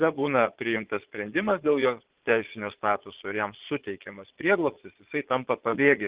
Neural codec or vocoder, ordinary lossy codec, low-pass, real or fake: vocoder, 22.05 kHz, 80 mel bands, Vocos; Opus, 16 kbps; 3.6 kHz; fake